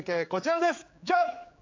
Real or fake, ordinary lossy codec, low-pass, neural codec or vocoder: fake; AAC, 48 kbps; 7.2 kHz; codec, 16 kHz, 4 kbps, X-Codec, HuBERT features, trained on general audio